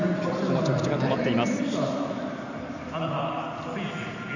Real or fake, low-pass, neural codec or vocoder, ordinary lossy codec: fake; 7.2 kHz; vocoder, 44.1 kHz, 128 mel bands every 256 samples, BigVGAN v2; none